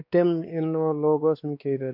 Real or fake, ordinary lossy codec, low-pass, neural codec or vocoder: fake; none; 5.4 kHz; codec, 16 kHz, 2 kbps, X-Codec, WavLM features, trained on Multilingual LibriSpeech